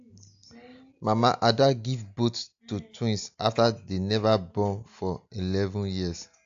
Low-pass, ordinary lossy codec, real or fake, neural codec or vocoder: 7.2 kHz; AAC, 64 kbps; real; none